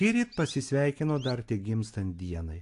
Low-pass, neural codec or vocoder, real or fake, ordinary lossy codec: 10.8 kHz; none; real; AAC, 48 kbps